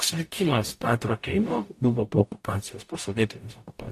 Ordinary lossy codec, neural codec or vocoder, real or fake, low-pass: AAC, 64 kbps; codec, 44.1 kHz, 0.9 kbps, DAC; fake; 14.4 kHz